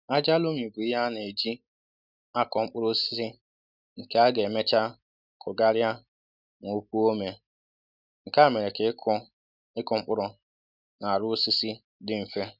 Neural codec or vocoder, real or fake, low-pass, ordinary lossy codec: none; real; 5.4 kHz; none